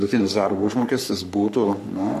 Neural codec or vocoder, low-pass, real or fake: codec, 44.1 kHz, 3.4 kbps, Pupu-Codec; 14.4 kHz; fake